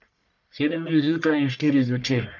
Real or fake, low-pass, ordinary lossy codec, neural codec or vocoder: fake; 7.2 kHz; none; codec, 44.1 kHz, 1.7 kbps, Pupu-Codec